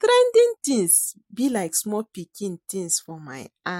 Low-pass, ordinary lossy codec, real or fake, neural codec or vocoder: 19.8 kHz; MP3, 64 kbps; real; none